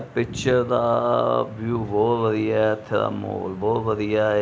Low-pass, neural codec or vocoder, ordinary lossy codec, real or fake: none; none; none; real